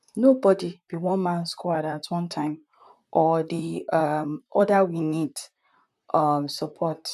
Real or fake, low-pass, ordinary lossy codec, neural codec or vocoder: fake; 14.4 kHz; none; vocoder, 44.1 kHz, 128 mel bands, Pupu-Vocoder